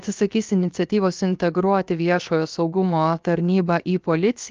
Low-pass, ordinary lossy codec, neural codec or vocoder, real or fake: 7.2 kHz; Opus, 24 kbps; codec, 16 kHz, 0.7 kbps, FocalCodec; fake